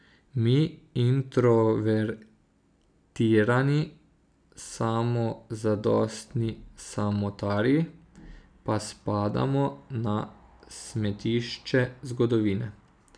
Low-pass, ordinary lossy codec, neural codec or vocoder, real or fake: 9.9 kHz; none; none; real